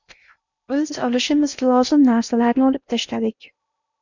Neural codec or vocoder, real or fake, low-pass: codec, 16 kHz in and 24 kHz out, 0.8 kbps, FocalCodec, streaming, 65536 codes; fake; 7.2 kHz